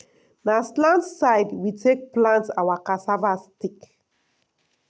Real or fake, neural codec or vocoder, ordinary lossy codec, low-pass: real; none; none; none